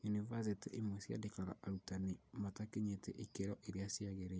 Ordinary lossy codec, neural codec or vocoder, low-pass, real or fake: none; none; none; real